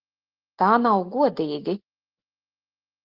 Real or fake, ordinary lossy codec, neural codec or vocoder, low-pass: real; Opus, 16 kbps; none; 5.4 kHz